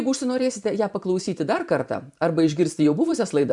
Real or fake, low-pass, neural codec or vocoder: fake; 10.8 kHz; vocoder, 44.1 kHz, 128 mel bands every 512 samples, BigVGAN v2